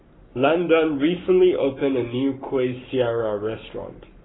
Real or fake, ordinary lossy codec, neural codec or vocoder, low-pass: fake; AAC, 16 kbps; codec, 44.1 kHz, 7.8 kbps, Pupu-Codec; 7.2 kHz